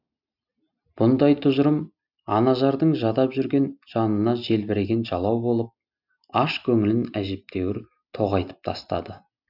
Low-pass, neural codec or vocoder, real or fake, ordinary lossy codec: 5.4 kHz; none; real; none